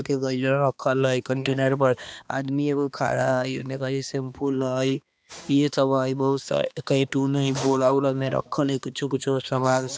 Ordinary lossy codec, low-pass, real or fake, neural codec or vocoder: none; none; fake; codec, 16 kHz, 2 kbps, X-Codec, HuBERT features, trained on balanced general audio